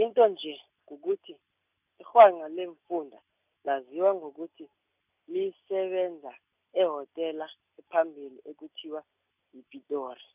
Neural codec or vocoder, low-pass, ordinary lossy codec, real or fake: none; 3.6 kHz; none; real